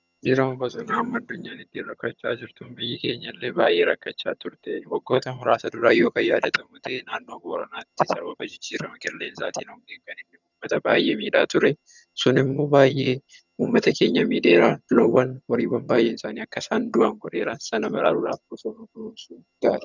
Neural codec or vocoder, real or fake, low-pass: vocoder, 22.05 kHz, 80 mel bands, HiFi-GAN; fake; 7.2 kHz